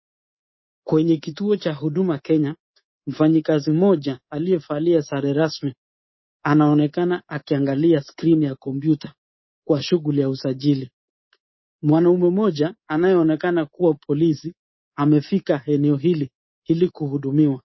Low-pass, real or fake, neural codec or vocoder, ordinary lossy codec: 7.2 kHz; fake; codec, 24 kHz, 3.1 kbps, DualCodec; MP3, 24 kbps